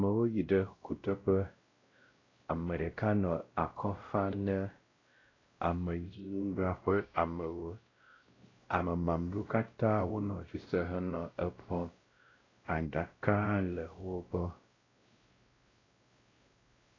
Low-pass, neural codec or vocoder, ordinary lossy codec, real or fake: 7.2 kHz; codec, 16 kHz, 0.5 kbps, X-Codec, WavLM features, trained on Multilingual LibriSpeech; AAC, 32 kbps; fake